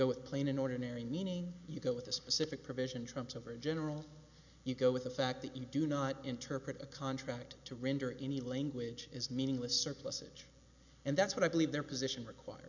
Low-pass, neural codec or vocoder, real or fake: 7.2 kHz; none; real